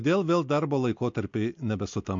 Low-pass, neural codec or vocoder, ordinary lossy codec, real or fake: 7.2 kHz; none; MP3, 48 kbps; real